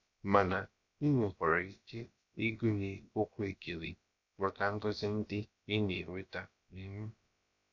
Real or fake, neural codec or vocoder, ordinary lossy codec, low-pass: fake; codec, 16 kHz, about 1 kbps, DyCAST, with the encoder's durations; none; 7.2 kHz